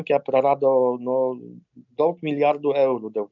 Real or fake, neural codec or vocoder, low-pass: real; none; 7.2 kHz